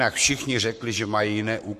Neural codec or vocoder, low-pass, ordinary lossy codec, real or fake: codec, 44.1 kHz, 7.8 kbps, Pupu-Codec; 14.4 kHz; MP3, 64 kbps; fake